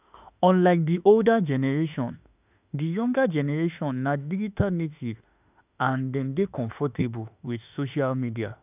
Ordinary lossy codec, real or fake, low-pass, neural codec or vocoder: none; fake; 3.6 kHz; autoencoder, 48 kHz, 32 numbers a frame, DAC-VAE, trained on Japanese speech